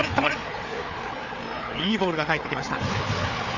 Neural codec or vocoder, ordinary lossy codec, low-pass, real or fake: codec, 16 kHz, 4 kbps, FreqCodec, larger model; none; 7.2 kHz; fake